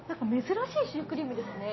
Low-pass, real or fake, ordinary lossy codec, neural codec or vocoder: 7.2 kHz; real; MP3, 24 kbps; none